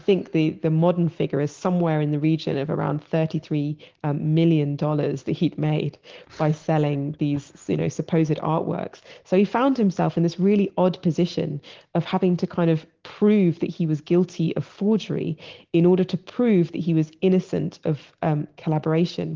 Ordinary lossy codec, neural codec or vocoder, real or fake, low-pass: Opus, 16 kbps; none; real; 7.2 kHz